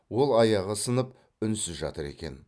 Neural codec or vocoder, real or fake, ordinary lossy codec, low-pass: none; real; none; none